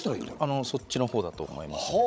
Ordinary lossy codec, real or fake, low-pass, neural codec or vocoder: none; fake; none; codec, 16 kHz, 16 kbps, FreqCodec, larger model